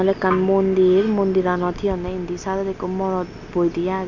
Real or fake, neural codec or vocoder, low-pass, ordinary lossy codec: real; none; 7.2 kHz; none